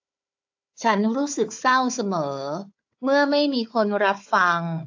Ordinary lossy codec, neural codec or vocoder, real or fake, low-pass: AAC, 48 kbps; codec, 16 kHz, 4 kbps, FunCodec, trained on Chinese and English, 50 frames a second; fake; 7.2 kHz